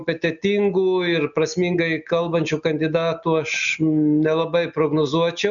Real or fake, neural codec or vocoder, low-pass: real; none; 7.2 kHz